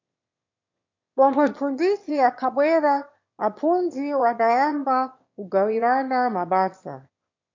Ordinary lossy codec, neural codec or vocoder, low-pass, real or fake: MP3, 48 kbps; autoencoder, 22.05 kHz, a latent of 192 numbers a frame, VITS, trained on one speaker; 7.2 kHz; fake